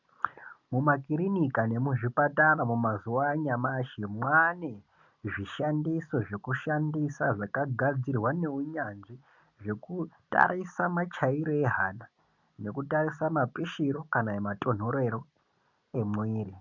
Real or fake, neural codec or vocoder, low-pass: real; none; 7.2 kHz